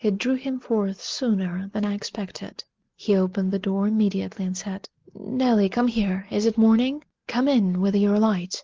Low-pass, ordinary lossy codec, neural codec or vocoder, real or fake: 7.2 kHz; Opus, 16 kbps; none; real